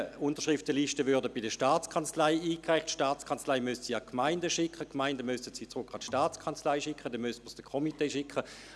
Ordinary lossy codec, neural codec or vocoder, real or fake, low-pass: none; none; real; none